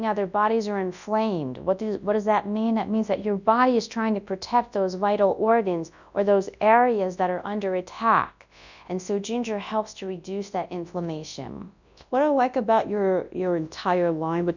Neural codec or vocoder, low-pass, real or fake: codec, 24 kHz, 0.9 kbps, WavTokenizer, large speech release; 7.2 kHz; fake